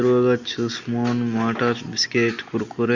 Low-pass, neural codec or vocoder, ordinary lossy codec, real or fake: 7.2 kHz; none; Opus, 64 kbps; real